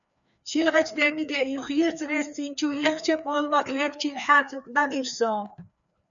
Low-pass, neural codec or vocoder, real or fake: 7.2 kHz; codec, 16 kHz, 2 kbps, FreqCodec, larger model; fake